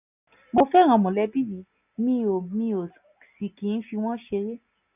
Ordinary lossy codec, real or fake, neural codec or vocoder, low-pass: none; real; none; 3.6 kHz